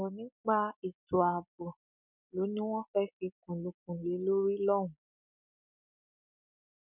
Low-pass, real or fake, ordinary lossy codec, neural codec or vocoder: 3.6 kHz; real; none; none